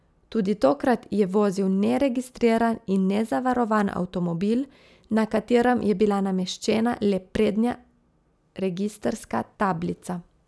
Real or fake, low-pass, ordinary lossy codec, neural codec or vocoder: real; none; none; none